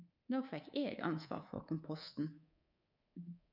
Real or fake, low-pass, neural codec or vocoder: fake; 5.4 kHz; codec, 24 kHz, 3.1 kbps, DualCodec